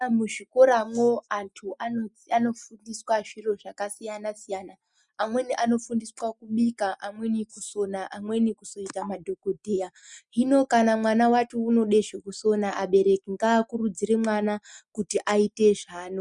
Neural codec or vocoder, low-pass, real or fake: none; 10.8 kHz; real